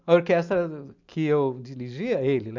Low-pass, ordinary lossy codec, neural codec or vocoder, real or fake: 7.2 kHz; MP3, 64 kbps; none; real